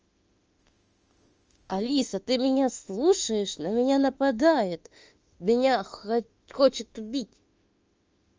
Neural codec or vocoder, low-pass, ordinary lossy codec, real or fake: autoencoder, 48 kHz, 32 numbers a frame, DAC-VAE, trained on Japanese speech; 7.2 kHz; Opus, 24 kbps; fake